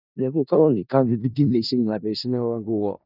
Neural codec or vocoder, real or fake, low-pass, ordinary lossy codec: codec, 16 kHz in and 24 kHz out, 0.4 kbps, LongCat-Audio-Codec, four codebook decoder; fake; 5.4 kHz; none